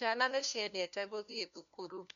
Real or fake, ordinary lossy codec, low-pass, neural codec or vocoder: fake; none; 7.2 kHz; codec, 16 kHz, 1 kbps, FunCodec, trained on LibriTTS, 50 frames a second